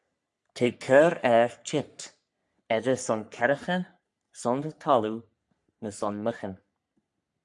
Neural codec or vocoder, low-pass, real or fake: codec, 44.1 kHz, 3.4 kbps, Pupu-Codec; 10.8 kHz; fake